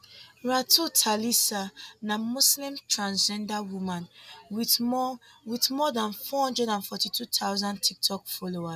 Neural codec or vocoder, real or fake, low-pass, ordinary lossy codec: none; real; 14.4 kHz; none